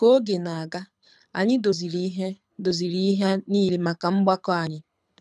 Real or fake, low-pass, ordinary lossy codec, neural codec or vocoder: fake; none; none; codec, 24 kHz, 6 kbps, HILCodec